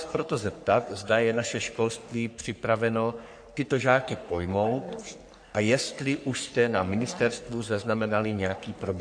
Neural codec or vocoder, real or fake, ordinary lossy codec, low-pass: codec, 44.1 kHz, 3.4 kbps, Pupu-Codec; fake; AAC, 64 kbps; 9.9 kHz